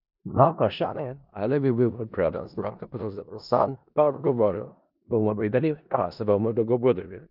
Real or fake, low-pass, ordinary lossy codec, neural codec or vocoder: fake; 5.4 kHz; none; codec, 16 kHz in and 24 kHz out, 0.4 kbps, LongCat-Audio-Codec, four codebook decoder